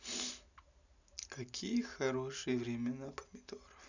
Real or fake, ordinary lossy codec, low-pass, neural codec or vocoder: real; none; 7.2 kHz; none